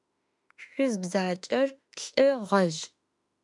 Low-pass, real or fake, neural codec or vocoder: 10.8 kHz; fake; autoencoder, 48 kHz, 32 numbers a frame, DAC-VAE, trained on Japanese speech